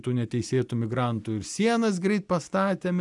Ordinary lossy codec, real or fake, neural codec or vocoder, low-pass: MP3, 96 kbps; real; none; 10.8 kHz